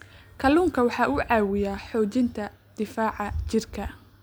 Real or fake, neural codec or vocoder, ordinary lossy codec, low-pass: real; none; none; none